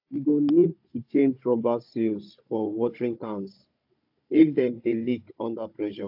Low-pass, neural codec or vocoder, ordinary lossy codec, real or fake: 5.4 kHz; codec, 16 kHz, 16 kbps, FunCodec, trained on Chinese and English, 50 frames a second; AAC, 48 kbps; fake